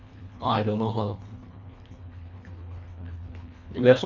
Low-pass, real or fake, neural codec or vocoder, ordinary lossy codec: 7.2 kHz; fake; codec, 24 kHz, 1.5 kbps, HILCodec; none